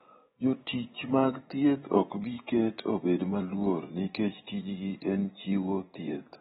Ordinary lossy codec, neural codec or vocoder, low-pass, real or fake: AAC, 16 kbps; vocoder, 24 kHz, 100 mel bands, Vocos; 10.8 kHz; fake